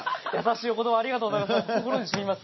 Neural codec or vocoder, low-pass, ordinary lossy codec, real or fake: none; 7.2 kHz; MP3, 24 kbps; real